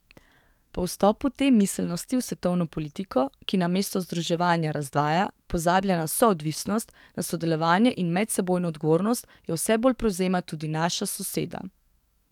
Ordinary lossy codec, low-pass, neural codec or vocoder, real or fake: none; 19.8 kHz; codec, 44.1 kHz, 7.8 kbps, DAC; fake